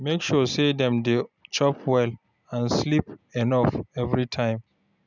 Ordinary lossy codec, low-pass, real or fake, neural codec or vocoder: none; 7.2 kHz; real; none